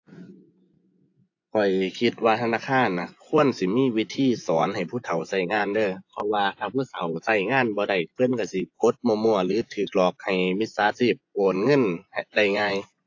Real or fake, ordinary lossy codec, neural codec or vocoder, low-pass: fake; AAC, 48 kbps; vocoder, 24 kHz, 100 mel bands, Vocos; 7.2 kHz